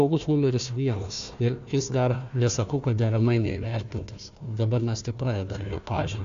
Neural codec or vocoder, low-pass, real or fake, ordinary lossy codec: codec, 16 kHz, 1 kbps, FunCodec, trained on Chinese and English, 50 frames a second; 7.2 kHz; fake; AAC, 64 kbps